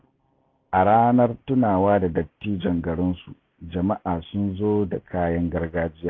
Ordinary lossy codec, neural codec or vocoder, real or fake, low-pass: AAC, 32 kbps; none; real; 7.2 kHz